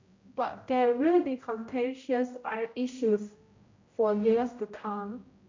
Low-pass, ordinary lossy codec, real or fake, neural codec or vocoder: 7.2 kHz; MP3, 48 kbps; fake; codec, 16 kHz, 0.5 kbps, X-Codec, HuBERT features, trained on general audio